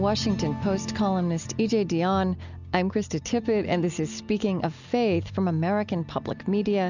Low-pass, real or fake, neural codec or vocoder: 7.2 kHz; real; none